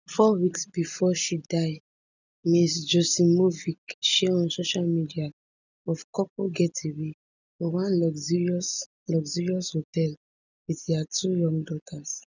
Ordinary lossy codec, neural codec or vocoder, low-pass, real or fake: none; none; 7.2 kHz; real